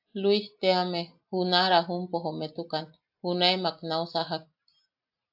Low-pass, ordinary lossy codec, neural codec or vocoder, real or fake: 5.4 kHz; AAC, 48 kbps; none; real